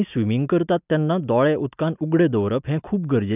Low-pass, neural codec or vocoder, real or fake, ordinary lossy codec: 3.6 kHz; none; real; none